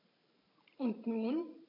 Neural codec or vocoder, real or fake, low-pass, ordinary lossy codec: vocoder, 44.1 kHz, 128 mel bands every 512 samples, BigVGAN v2; fake; 5.4 kHz; MP3, 32 kbps